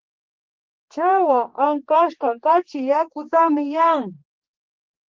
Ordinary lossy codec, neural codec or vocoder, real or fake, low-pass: Opus, 16 kbps; codec, 16 kHz, 4 kbps, X-Codec, HuBERT features, trained on general audio; fake; 7.2 kHz